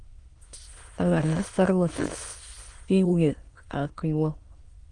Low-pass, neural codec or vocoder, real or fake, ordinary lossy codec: 9.9 kHz; autoencoder, 22.05 kHz, a latent of 192 numbers a frame, VITS, trained on many speakers; fake; Opus, 24 kbps